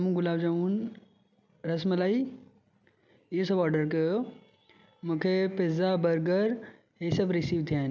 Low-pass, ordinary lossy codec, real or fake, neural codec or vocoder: 7.2 kHz; none; real; none